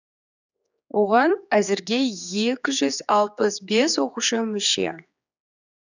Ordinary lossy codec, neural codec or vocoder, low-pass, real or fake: none; codec, 16 kHz, 4 kbps, X-Codec, HuBERT features, trained on general audio; 7.2 kHz; fake